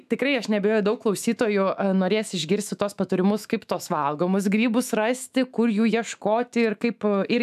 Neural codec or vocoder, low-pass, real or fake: autoencoder, 48 kHz, 128 numbers a frame, DAC-VAE, trained on Japanese speech; 14.4 kHz; fake